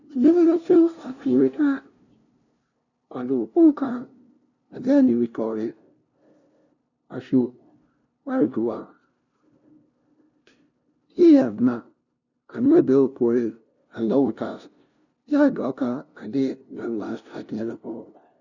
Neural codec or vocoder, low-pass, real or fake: codec, 16 kHz, 0.5 kbps, FunCodec, trained on LibriTTS, 25 frames a second; 7.2 kHz; fake